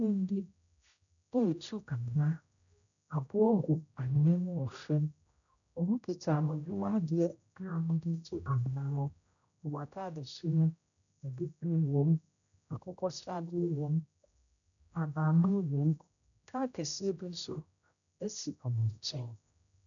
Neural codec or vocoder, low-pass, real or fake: codec, 16 kHz, 0.5 kbps, X-Codec, HuBERT features, trained on general audio; 7.2 kHz; fake